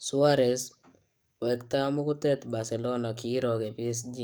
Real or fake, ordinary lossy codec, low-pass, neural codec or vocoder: fake; none; none; codec, 44.1 kHz, 7.8 kbps, DAC